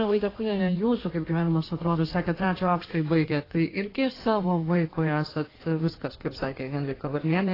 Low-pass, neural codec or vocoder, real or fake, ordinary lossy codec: 5.4 kHz; codec, 16 kHz in and 24 kHz out, 1.1 kbps, FireRedTTS-2 codec; fake; AAC, 24 kbps